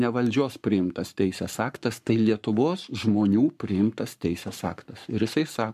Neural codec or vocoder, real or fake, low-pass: codec, 44.1 kHz, 7.8 kbps, Pupu-Codec; fake; 14.4 kHz